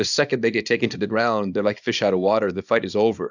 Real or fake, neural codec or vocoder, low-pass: fake; codec, 24 kHz, 0.9 kbps, WavTokenizer, small release; 7.2 kHz